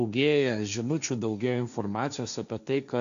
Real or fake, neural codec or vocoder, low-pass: fake; codec, 16 kHz, 1.1 kbps, Voila-Tokenizer; 7.2 kHz